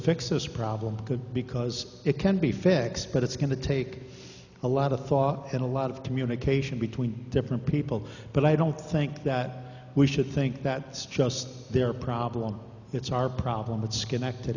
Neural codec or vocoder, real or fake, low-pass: none; real; 7.2 kHz